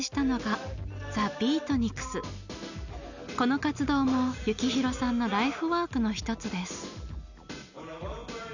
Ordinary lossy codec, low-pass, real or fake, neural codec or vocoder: none; 7.2 kHz; real; none